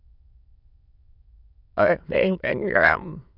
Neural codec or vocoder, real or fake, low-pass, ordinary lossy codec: autoencoder, 22.05 kHz, a latent of 192 numbers a frame, VITS, trained on many speakers; fake; 5.4 kHz; none